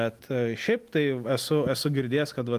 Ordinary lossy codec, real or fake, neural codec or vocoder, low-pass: Opus, 32 kbps; real; none; 14.4 kHz